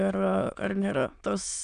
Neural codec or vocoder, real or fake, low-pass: autoencoder, 22.05 kHz, a latent of 192 numbers a frame, VITS, trained on many speakers; fake; 9.9 kHz